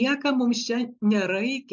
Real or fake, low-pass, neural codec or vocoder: real; 7.2 kHz; none